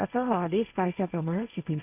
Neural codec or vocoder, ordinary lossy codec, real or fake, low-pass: codec, 16 kHz, 1.1 kbps, Voila-Tokenizer; none; fake; 3.6 kHz